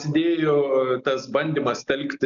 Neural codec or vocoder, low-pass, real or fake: none; 7.2 kHz; real